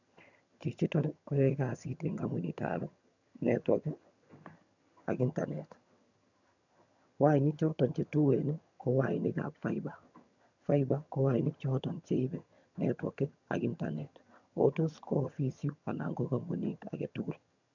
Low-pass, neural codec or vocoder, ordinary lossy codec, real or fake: 7.2 kHz; vocoder, 22.05 kHz, 80 mel bands, HiFi-GAN; none; fake